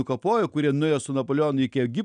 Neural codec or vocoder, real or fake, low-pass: none; real; 9.9 kHz